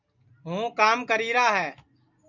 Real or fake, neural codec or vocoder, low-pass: real; none; 7.2 kHz